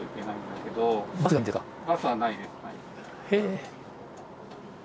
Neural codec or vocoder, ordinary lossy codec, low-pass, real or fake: none; none; none; real